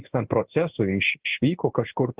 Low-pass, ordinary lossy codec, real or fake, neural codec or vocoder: 3.6 kHz; Opus, 16 kbps; fake; codec, 16 kHz in and 24 kHz out, 1 kbps, XY-Tokenizer